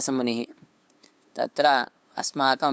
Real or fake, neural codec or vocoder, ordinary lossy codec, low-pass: fake; codec, 16 kHz, 2 kbps, FunCodec, trained on LibriTTS, 25 frames a second; none; none